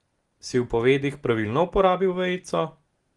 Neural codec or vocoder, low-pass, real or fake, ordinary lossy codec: none; 10.8 kHz; real; Opus, 24 kbps